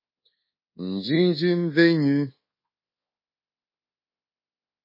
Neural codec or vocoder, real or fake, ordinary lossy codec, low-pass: codec, 24 kHz, 1.2 kbps, DualCodec; fake; MP3, 24 kbps; 5.4 kHz